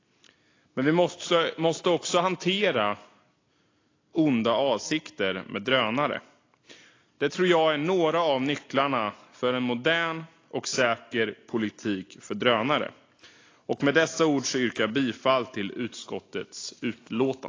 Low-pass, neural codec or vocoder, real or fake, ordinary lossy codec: 7.2 kHz; none; real; AAC, 32 kbps